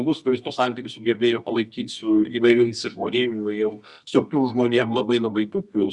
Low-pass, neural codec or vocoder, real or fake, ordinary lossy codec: 10.8 kHz; codec, 24 kHz, 0.9 kbps, WavTokenizer, medium music audio release; fake; Opus, 64 kbps